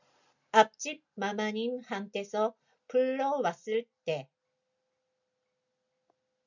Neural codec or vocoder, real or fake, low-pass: none; real; 7.2 kHz